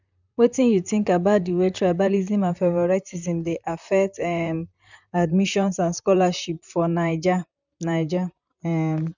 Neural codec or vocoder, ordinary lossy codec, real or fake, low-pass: vocoder, 44.1 kHz, 128 mel bands, Pupu-Vocoder; none; fake; 7.2 kHz